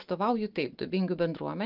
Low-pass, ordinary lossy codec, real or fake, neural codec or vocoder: 5.4 kHz; Opus, 24 kbps; real; none